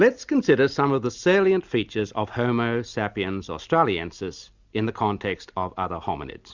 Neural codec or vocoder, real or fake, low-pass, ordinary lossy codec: none; real; 7.2 kHz; Opus, 64 kbps